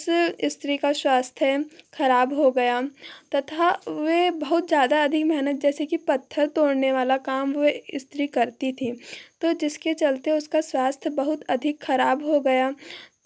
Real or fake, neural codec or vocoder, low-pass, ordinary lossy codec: real; none; none; none